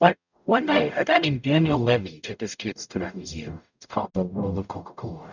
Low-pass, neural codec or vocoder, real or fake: 7.2 kHz; codec, 44.1 kHz, 0.9 kbps, DAC; fake